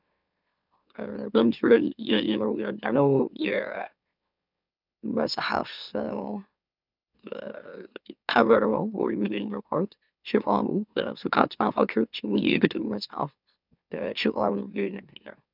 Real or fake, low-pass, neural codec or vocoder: fake; 5.4 kHz; autoencoder, 44.1 kHz, a latent of 192 numbers a frame, MeloTTS